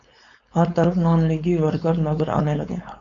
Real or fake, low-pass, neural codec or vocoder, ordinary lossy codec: fake; 7.2 kHz; codec, 16 kHz, 4.8 kbps, FACodec; AAC, 64 kbps